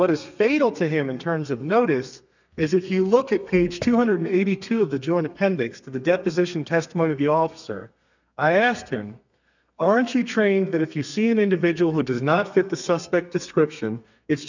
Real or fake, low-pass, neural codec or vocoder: fake; 7.2 kHz; codec, 32 kHz, 1.9 kbps, SNAC